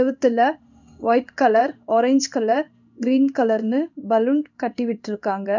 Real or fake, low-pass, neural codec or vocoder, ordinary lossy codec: fake; 7.2 kHz; codec, 16 kHz in and 24 kHz out, 1 kbps, XY-Tokenizer; none